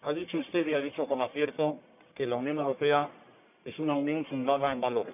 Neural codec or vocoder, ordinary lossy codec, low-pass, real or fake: codec, 44.1 kHz, 1.7 kbps, Pupu-Codec; none; 3.6 kHz; fake